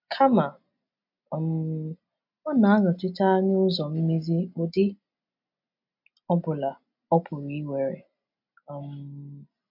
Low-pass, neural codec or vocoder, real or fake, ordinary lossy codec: 5.4 kHz; none; real; MP3, 48 kbps